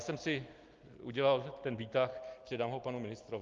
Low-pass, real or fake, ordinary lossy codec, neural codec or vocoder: 7.2 kHz; real; Opus, 32 kbps; none